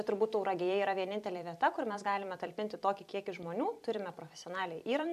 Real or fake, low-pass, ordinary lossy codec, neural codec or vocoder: fake; 14.4 kHz; MP3, 96 kbps; vocoder, 44.1 kHz, 128 mel bands every 256 samples, BigVGAN v2